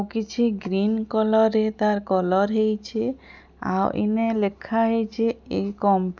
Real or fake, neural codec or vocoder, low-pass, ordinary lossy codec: real; none; 7.2 kHz; none